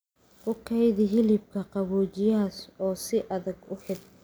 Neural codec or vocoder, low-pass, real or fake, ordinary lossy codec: none; none; real; none